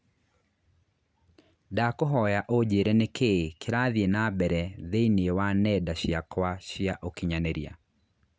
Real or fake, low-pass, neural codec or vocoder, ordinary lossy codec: real; none; none; none